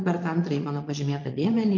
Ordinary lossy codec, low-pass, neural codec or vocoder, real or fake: MP3, 48 kbps; 7.2 kHz; vocoder, 22.05 kHz, 80 mel bands, Vocos; fake